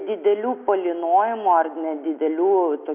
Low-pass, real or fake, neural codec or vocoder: 3.6 kHz; real; none